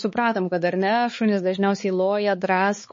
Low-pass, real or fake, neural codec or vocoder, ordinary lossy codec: 7.2 kHz; fake; codec, 16 kHz, 4 kbps, X-Codec, HuBERT features, trained on balanced general audio; MP3, 32 kbps